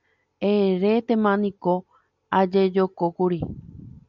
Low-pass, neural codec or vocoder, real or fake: 7.2 kHz; none; real